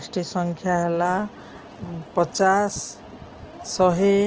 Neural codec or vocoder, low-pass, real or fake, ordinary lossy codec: none; 7.2 kHz; real; Opus, 16 kbps